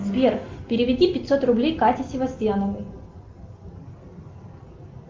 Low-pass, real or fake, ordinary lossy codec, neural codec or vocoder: 7.2 kHz; real; Opus, 32 kbps; none